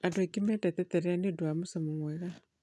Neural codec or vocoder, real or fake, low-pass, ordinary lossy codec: none; real; none; none